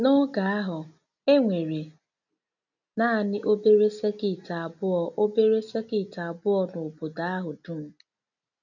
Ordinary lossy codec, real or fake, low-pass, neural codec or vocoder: none; real; 7.2 kHz; none